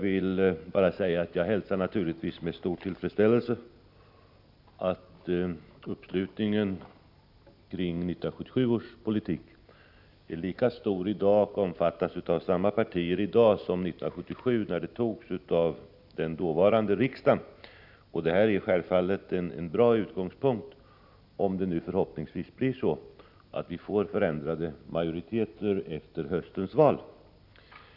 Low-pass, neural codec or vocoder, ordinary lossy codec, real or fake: 5.4 kHz; none; none; real